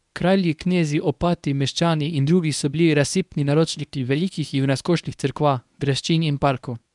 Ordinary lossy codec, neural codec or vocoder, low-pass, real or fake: none; codec, 24 kHz, 0.9 kbps, WavTokenizer, medium speech release version 1; 10.8 kHz; fake